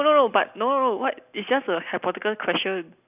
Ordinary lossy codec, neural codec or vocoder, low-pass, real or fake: none; none; 3.6 kHz; real